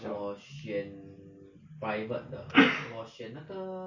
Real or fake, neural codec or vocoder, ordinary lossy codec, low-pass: real; none; AAC, 48 kbps; 7.2 kHz